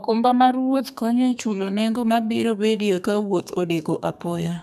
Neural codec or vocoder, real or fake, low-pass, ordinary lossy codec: codec, 32 kHz, 1.9 kbps, SNAC; fake; 14.4 kHz; none